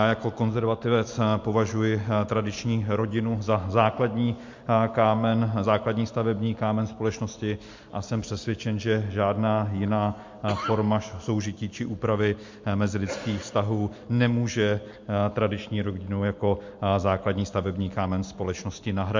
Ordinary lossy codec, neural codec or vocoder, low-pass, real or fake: MP3, 48 kbps; none; 7.2 kHz; real